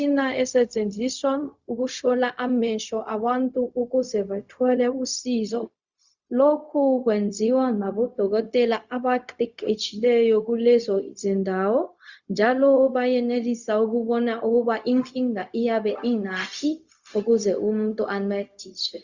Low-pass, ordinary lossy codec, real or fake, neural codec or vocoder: 7.2 kHz; Opus, 64 kbps; fake; codec, 16 kHz, 0.4 kbps, LongCat-Audio-Codec